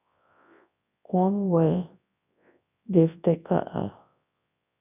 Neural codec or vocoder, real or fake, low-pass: codec, 24 kHz, 0.9 kbps, WavTokenizer, large speech release; fake; 3.6 kHz